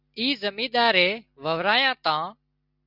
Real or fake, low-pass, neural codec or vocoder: real; 5.4 kHz; none